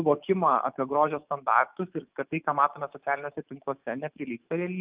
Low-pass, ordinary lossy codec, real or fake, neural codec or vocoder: 3.6 kHz; Opus, 32 kbps; fake; autoencoder, 48 kHz, 128 numbers a frame, DAC-VAE, trained on Japanese speech